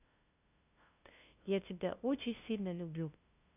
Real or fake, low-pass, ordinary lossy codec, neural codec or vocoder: fake; 3.6 kHz; none; codec, 16 kHz, 0.5 kbps, FunCodec, trained on LibriTTS, 25 frames a second